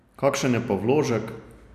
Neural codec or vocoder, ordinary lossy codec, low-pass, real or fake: none; none; 14.4 kHz; real